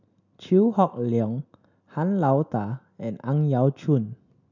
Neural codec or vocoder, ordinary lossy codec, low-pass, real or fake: none; none; 7.2 kHz; real